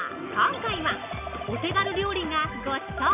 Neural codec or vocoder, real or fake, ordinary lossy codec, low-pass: none; real; none; 3.6 kHz